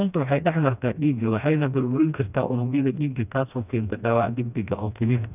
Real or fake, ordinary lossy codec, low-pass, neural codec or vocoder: fake; none; 3.6 kHz; codec, 16 kHz, 1 kbps, FreqCodec, smaller model